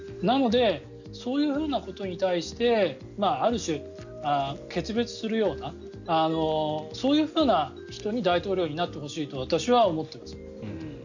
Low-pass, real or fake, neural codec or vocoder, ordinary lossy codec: 7.2 kHz; real; none; none